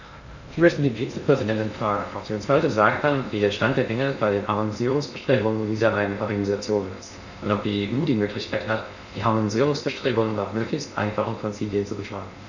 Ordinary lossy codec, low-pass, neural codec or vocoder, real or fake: none; 7.2 kHz; codec, 16 kHz in and 24 kHz out, 0.6 kbps, FocalCodec, streaming, 2048 codes; fake